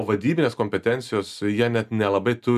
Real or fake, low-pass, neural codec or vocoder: real; 14.4 kHz; none